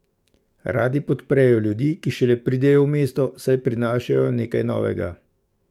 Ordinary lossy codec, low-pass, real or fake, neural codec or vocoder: MP3, 96 kbps; 19.8 kHz; fake; codec, 44.1 kHz, 7.8 kbps, DAC